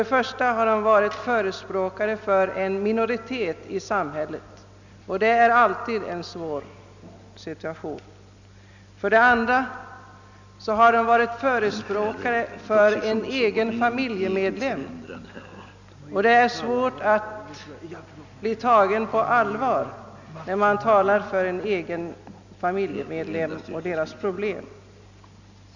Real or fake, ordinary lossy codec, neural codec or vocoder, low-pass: real; none; none; 7.2 kHz